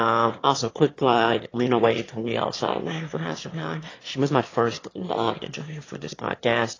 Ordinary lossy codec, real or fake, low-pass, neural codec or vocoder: AAC, 32 kbps; fake; 7.2 kHz; autoencoder, 22.05 kHz, a latent of 192 numbers a frame, VITS, trained on one speaker